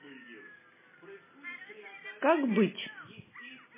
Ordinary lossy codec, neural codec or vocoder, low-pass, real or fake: MP3, 16 kbps; none; 3.6 kHz; real